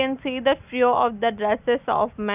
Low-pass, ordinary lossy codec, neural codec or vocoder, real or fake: 3.6 kHz; none; none; real